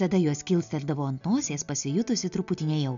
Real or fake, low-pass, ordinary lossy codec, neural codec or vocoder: real; 7.2 kHz; MP3, 96 kbps; none